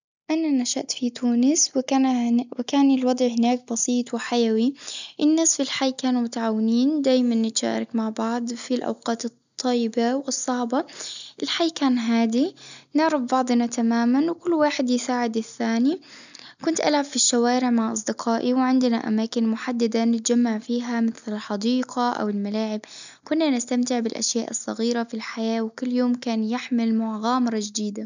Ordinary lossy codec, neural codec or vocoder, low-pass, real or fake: none; none; 7.2 kHz; real